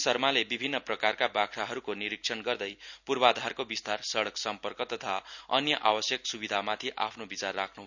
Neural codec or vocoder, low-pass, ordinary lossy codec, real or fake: none; 7.2 kHz; none; real